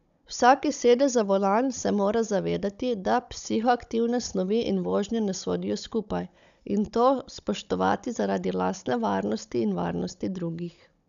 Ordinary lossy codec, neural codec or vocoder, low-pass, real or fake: none; codec, 16 kHz, 16 kbps, FunCodec, trained on Chinese and English, 50 frames a second; 7.2 kHz; fake